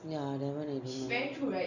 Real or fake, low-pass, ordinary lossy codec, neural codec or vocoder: real; 7.2 kHz; none; none